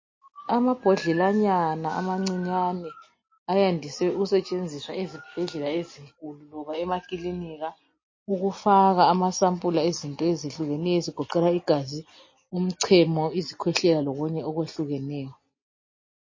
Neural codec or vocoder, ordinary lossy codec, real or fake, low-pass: none; MP3, 32 kbps; real; 7.2 kHz